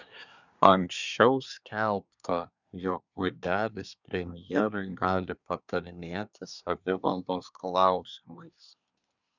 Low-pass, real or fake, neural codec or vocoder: 7.2 kHz; fake; codec, 24 kHz, 1 kbps, SNAC